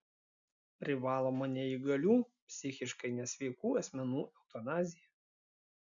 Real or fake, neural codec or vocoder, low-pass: real; none; 7.2 kHz